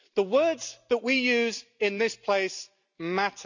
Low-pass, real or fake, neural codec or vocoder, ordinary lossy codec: 7.2 kHz; real; none; none